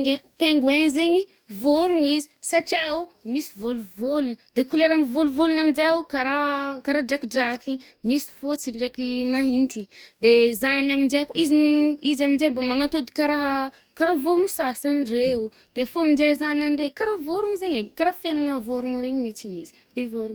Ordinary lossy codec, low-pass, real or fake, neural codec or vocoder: none; none; fake; codec, 44.1 kHz, 2.6 kbps, DAC